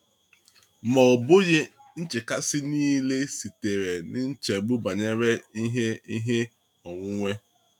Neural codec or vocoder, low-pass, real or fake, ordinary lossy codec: autoencoder, 48 kHz, 128 numbers a frame, DAC-VAE, trained on Japanese speech; 19.8 kHz; fake; none